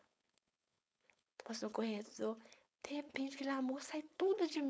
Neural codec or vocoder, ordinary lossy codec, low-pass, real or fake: codec, 16 kHz, 4.8 kbps, FACodec; none; none; fake